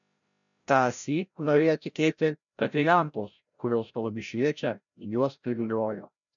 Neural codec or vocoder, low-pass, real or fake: codec, 16 kHz, 0.5 kbps, FreqCodec, larger model; 7.2 kHz; fake